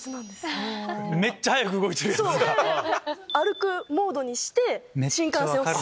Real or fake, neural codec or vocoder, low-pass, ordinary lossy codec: real; none; none; none